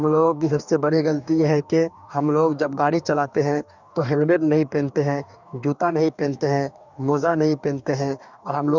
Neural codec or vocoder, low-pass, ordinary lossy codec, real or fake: codec, 44.1 kHz, 2.6 kbps, DAC; 7.2 kHz; none; fake